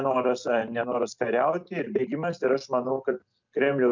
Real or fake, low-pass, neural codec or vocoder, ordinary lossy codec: real; 7.2 kHz; none; MP3, 64 kbps